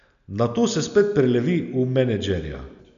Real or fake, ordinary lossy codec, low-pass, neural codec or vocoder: real; none; 7.2 kHz; none